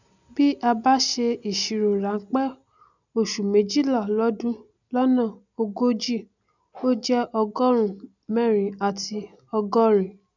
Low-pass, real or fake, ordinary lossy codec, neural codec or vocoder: 7.2 kHz; real; none; none